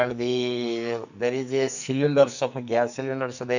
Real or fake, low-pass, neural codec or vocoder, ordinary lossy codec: fake; 7.2 kHz; codec, 44.1 kHz, 2.6 kbps, SNAC; none